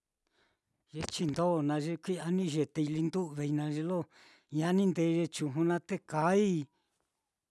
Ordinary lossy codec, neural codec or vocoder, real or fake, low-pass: none; none; real; none